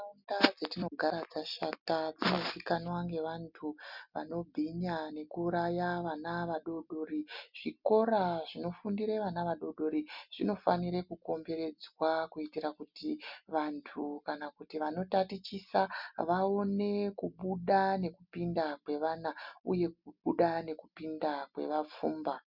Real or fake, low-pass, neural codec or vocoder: real; 5.4 kHz; none